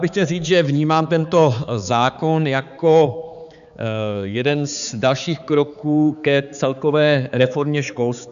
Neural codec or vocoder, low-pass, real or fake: codec, 16 kHz, 4 kbps, X-Codec, HuBERT features, trained on balanced general audio; 7.2 kHz; fake